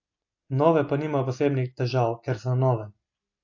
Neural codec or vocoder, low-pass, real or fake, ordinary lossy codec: none; 7.2 kHz; real; AAC, 48 kbps